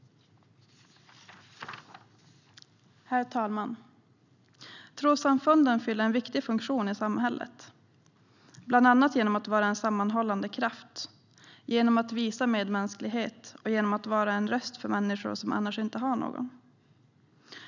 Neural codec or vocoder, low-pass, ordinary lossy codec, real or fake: none; 7.2 kHz; none; real